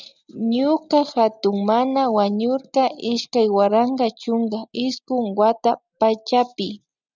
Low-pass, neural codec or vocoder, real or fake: 7.2 kHz; none; real